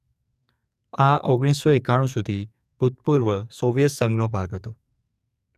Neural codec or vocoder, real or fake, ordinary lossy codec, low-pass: codec, 44.1 kHz, 2.6 kbps, SNAC; fake; none; 14.4 kHz